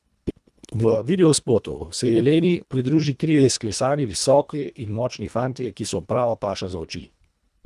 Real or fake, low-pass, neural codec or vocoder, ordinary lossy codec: fake; none; codec, 24 kHz, 1.5 kbps, HILCodec; none